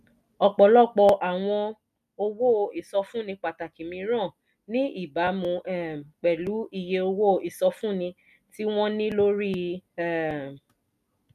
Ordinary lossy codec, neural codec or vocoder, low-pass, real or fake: none; none; 14.4 kHz; real